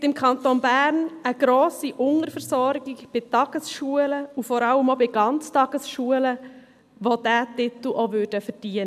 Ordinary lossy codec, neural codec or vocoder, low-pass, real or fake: AAC, 96 kbps; none; 14.4 kHz; real